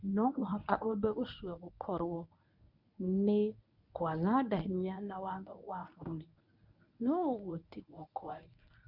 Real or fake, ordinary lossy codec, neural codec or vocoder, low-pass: fake; none; codec, 24 kHz, 0.9 kbps, WavTokenizer, medium speech release version 1; 5.4 kHz